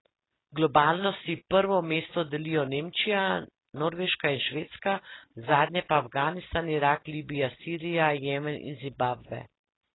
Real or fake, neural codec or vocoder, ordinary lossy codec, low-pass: real; none; AAC, 16 kbps; 7.2 kHz